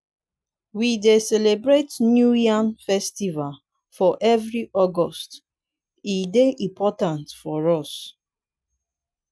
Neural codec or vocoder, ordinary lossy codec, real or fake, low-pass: none; none; real; none